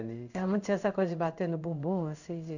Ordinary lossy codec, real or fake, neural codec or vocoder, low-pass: none; fake; codec, 16 kHz in and 24 kHz out, 1 kbps, XY-Tokenizer; 7.2 kHz